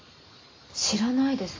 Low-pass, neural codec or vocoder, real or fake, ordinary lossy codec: 7.2 kHz; none; real; AAC, 32 kbps